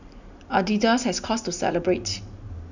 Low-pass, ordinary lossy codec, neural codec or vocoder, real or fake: 7.2 kHz; none; none; real